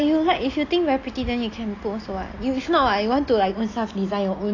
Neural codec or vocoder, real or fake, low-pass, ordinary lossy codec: none; real; 7.2 kHz; AAC, 32 kbps